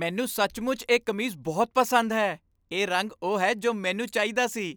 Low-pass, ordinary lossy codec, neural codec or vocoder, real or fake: none; none; none; real